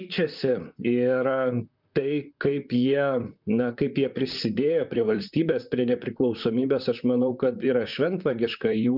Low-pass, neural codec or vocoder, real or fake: 5.4 kHz; vocoder, 44.1 kHz, 128 mel bands, Pupu-Vocoder; fake